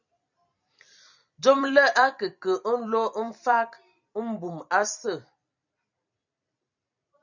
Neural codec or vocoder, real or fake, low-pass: vocoder, 44.1 kHz, 128 mel bands every 256 samples, BigVGAN v2; fake; 7.2 kHz